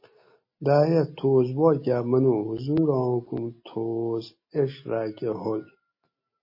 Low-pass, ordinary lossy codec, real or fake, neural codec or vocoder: 5.4 kHz; MP3, 24 kbps; real; none